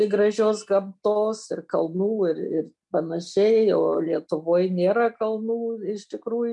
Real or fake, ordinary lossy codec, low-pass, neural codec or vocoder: real; MP3, 64 kbps; 10.8 kHz; none